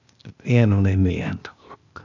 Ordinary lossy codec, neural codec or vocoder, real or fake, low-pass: none; codec, 16 kHz, 0.8 kbps, ZipCodec; fake; 7.2 kHz